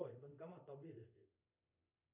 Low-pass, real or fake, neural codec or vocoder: 3.6 kHz; fake; codec, 24 kHz, 0.5 kbps, DualCodec